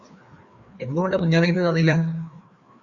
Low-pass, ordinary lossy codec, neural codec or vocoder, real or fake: 7.2 kHz; Opus, 64 kbps; codec, 16 kHz, 2 kbps, FreqCodec, larger model; fake